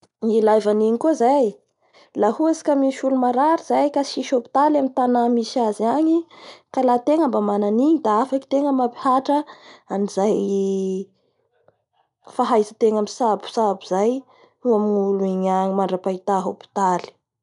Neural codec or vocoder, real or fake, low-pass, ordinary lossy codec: none; real; 10.8 kHz; none